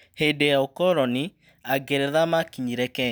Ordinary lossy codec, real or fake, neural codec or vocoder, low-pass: none; real; none; none